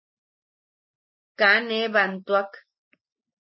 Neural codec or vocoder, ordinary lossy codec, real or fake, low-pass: none; MP3, 24 kbps; real; 7.2 kHz